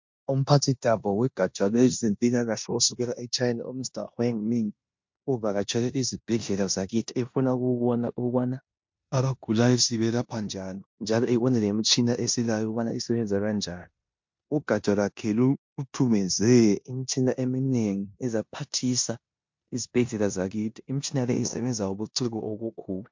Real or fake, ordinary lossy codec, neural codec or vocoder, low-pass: fake; MP3, 48 kbps; codec, 16 kHz in and 24 kHz out, 0.9 kbps, LongCat-Audio-Codec, four codebook decoder; 7.2 kHz